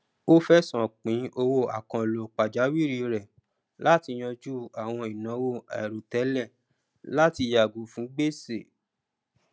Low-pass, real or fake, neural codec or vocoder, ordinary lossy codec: none; real; none; none